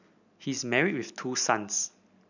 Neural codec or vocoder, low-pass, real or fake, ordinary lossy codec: none; 7.2 kHz; real; none